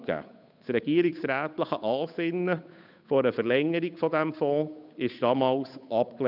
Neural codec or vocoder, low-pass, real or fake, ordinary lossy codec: codec, 16 kHz, 8 kbps, FunCodec, trained on Chinese and English, 25 frames a second; 5.4 kHz; fake; none